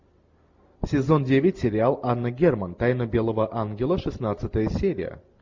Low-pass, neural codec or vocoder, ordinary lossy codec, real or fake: 7.2 kHz; none; MP3, 64 kbps; real